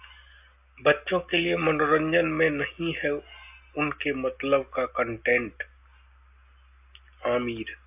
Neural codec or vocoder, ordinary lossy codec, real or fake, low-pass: none; AAC, 32 kbps; real; 3.6 kHz